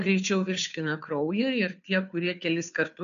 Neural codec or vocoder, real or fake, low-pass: codec, 16 kHz, 2 kbps, FunCodec, trained on Chinese and English, 25 frames a second; fake; 7.2 kHz